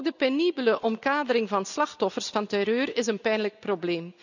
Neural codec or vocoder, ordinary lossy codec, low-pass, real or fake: none; none; 7.2 kHz; real